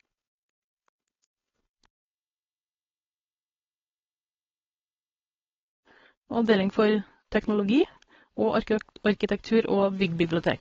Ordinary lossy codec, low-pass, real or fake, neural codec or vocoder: AAC, 24 kbps; 7.2 kHz; fake; codec, 16 kHz, 4.8 kbps, FACodec